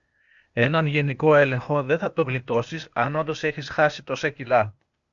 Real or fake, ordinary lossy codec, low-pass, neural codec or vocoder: fake; Opus, 64 kbps; 7.2 kHz; codec, 16 kHz, 0.8 kbps, ZipCodec